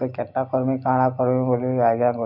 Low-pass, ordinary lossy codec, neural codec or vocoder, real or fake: 5.4 kHz; none; none; real